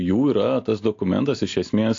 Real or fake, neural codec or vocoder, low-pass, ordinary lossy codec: real; none; 7.2 kHz; AAC, 48 kbps